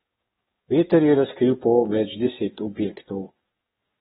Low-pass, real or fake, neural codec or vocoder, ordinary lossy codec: 19.8 kHz; fake; codec, 44.1 kHz, 7.8 kbps, DAC; AAC, 16 kbps